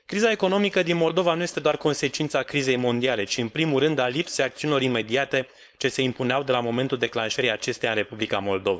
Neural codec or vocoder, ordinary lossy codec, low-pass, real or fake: codec, 16 kHz, 4.8 kbps, FACodec; none; none; fake